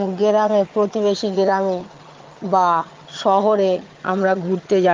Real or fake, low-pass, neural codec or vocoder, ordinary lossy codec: fake; 7.2 kHz; vocoder, 22.05 kHz, 80 mel bands, HiFi-GAN; Opus, 32 kbps